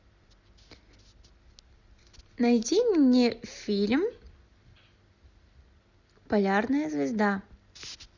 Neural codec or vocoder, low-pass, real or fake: none; 7.2 kHz; real